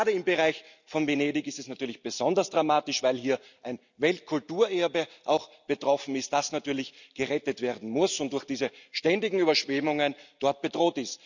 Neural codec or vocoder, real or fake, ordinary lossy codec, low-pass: none; real; none; 7.2 kHz